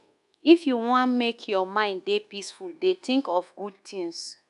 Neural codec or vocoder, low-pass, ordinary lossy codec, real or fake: codec, 24 kHz, 1.2 kbps, DualCodec; 10.8 kHz; none; fake